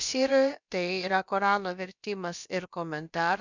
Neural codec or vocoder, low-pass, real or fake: codec, 16 kHz, about 1 kbps, DyCAST, with the encoder's durations; 7.2 kHz; fake